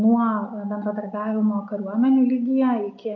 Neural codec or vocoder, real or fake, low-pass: none; real; 7.2 kHz